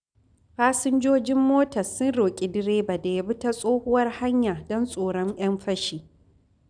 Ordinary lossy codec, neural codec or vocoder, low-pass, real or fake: none; none; 9.9 kHz; real